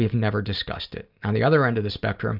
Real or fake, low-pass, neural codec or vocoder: real; 5.4 kHz; none